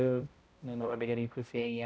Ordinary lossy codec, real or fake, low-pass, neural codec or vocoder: none; fake; none; codec, 16 kHz, 0.5 kbps, X-Codec, HuBERT features, trained on balanced general audio